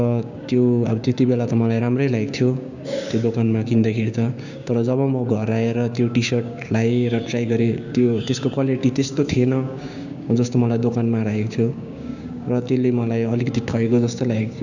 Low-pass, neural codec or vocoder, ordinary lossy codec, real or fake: 7.2 kHz; codec, 16 kHz, 6 kbps, DAC; none; fake